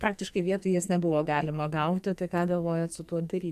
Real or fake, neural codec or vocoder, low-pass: fake; codec, 44.1 kHz, 2.6 kbps, SNAC; 14.4 kHz